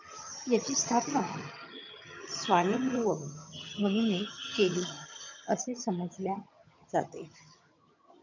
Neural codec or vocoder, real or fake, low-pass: vocoder, 22.05 kHz, 80 mel bands, HiFi-GAN; fake; 7.2 kHz